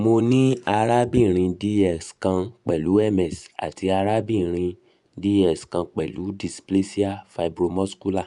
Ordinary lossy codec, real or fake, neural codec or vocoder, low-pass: none; fake; vocoder, 24 kHz, 100 mel bands, Vocos; 10.8 kHz